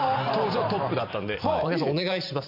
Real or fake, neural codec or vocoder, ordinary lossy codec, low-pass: fake; vocoder, 44.1 kHz, 128 mel bands every 512 samples, BigVGAN v2; none; 5.4 kHz